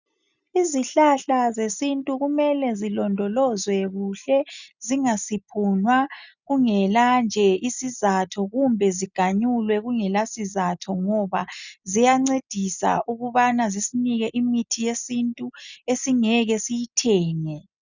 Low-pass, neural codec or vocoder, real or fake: 7.2 kHz; none; real